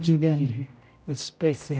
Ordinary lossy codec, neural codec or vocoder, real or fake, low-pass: none; codec, 16 kHz, 0.5 kbps, X-Codec, HuBERT features, trained on general audio; fake; none